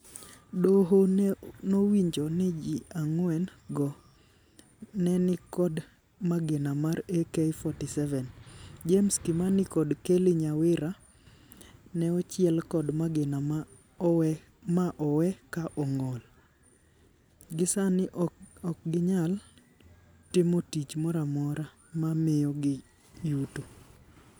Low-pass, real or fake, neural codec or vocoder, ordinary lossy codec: none; real; none; none